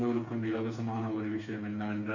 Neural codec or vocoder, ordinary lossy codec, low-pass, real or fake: codec, 16 kHz, 4 kbps, FreqCodec, smaller model; MP3, 48 kbps; 7.2 kHz; fake